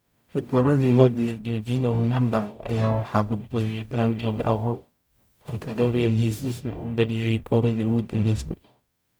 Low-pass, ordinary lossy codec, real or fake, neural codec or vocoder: none; none; fake; codec, 44.1 kHz, 0.9 kbps, DAC